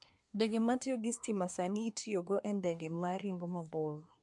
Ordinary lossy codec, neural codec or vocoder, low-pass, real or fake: MP3, 64 kbps; codec, 24 kHz, 1 kbps, SNAC; 10.8 kHz; fake